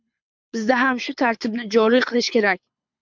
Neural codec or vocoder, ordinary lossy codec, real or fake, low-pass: codec, 24 kHz, 6 kbps, HILCodec; MP3, 64 kbps; fake; 7.2 kHz